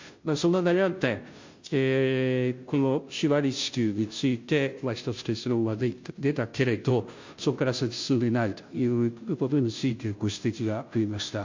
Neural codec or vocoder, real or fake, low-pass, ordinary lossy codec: codec, 16 kHz, 0.5 kbps, FunCodec, trained on Chinese and English, 25 frames a second; fake; 7.2 kHz; MP3, 48 kbps